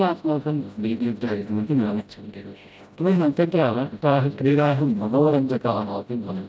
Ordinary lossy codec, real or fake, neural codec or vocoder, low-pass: none; fake; codec, 16 kHz, 0.5 kbps, FreqCodec, smaller model; none